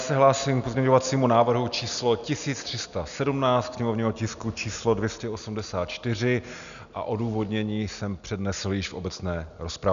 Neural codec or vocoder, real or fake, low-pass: none; real; 7.2 kHz